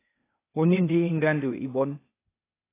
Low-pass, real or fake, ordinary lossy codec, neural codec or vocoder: 3.6 kHz; fake; AAC, 24 kbps; codec, 16 kHz, 0.8 kbps, ZipCodec